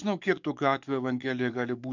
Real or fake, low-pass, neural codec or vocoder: fake; 7.2 kHz; codec, 44.1 kHz, 7.8 kbps, DAC